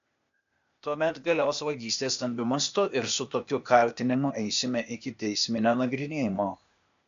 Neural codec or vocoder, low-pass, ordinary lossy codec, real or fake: codec, 16 kHz, 0.8 kbps, ZipCodec; 7.2 kHz; MP3, 64 kbps; fake